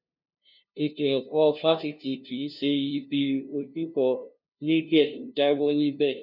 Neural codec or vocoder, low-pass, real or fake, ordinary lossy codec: codec, 16 kHz, 0.5 kbps, FunCodec, trained on LibriTTS, 25 frames a second; 5.4 kHz; fake; none